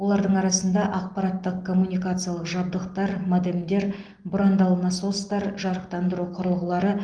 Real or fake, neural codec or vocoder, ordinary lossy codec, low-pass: real; none; Opus, 24 kbps; 9.9 kHz